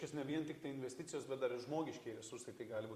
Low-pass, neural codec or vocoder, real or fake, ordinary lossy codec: 14.4 kHz; vocoder, 44.1 kHz, 128 mel bands every 256 samples, BigVGAN v2; fake; Opus, 64 kbps